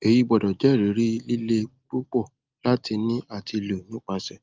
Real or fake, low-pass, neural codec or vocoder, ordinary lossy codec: real; 7.2 kHz; none; Opus, 32 kbps